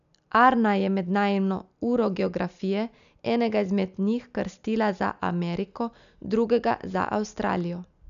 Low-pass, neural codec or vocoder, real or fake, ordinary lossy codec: 7.2 kHz; none; real; none